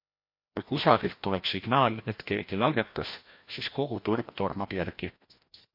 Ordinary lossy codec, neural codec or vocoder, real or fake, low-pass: MP3, 32 kbps; codec, 16 kHz, 1 kbps, FreqCodec, larger model; fake; 5.4 kHz